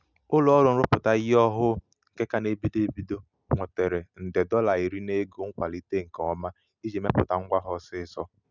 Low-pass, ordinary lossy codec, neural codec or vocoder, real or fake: 7.2 kHz; none; none; real